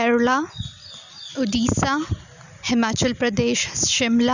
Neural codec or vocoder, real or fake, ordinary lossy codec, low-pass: none; real; none; 7.2 kHz